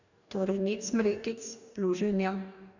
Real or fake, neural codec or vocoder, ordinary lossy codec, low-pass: fake; codec, 44.1 kHz, 2.6 kbps, DAC; none; 7.2 kHz